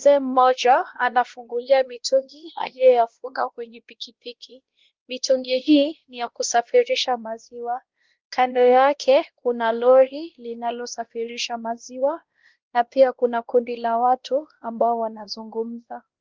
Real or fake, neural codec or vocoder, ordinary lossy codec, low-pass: fake; codec, 16 kHz, 1 kbps, X-Codec, WavLM features, trained on Multilingual LibriSpeech; Opus, 16 kbps; 7.2 kHz